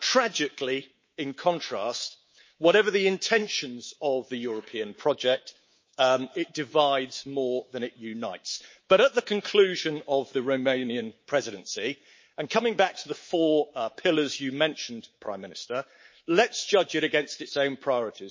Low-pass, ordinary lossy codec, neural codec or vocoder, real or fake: 7.2 kHz; MP3, 32 kbps; codec, 24 kHz, 3.1 kbps, DualCodec; fake